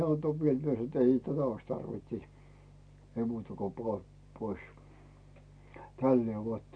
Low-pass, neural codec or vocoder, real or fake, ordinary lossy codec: 9.9 kHz; none; real; none